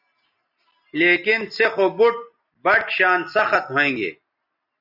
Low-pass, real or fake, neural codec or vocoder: 5.4 kHz; real; none